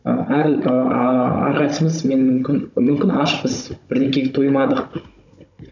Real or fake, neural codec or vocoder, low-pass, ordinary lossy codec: fake; codec, 16 kHz, 16 kbps, FunCodec, trained on Chinese and English, 50 frames a second; 7.2 kHz; none